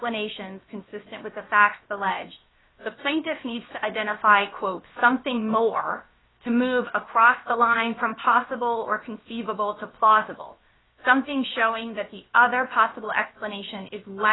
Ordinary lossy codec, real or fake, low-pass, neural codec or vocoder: AAC, 16 kbps; fake; 7.2 kHz; codec, 16 kHz, about 1 kbps, DyCAST, with the encoder's durations